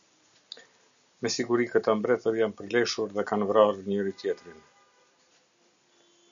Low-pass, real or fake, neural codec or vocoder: 7.2 kHz; real; none